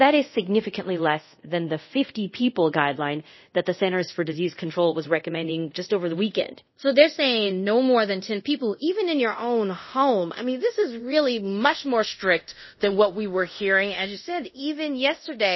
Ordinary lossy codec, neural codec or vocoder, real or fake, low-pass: MP3, 24 kbps; codec, 24 kHz, 0.5 kbps, DualCodec; fake; 7.2 kHz